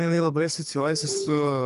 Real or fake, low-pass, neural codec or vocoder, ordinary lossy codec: fake; 10.8 kHz; codec, 24 kHz, 3 kbps, HILCodec; MP3, 96 kbps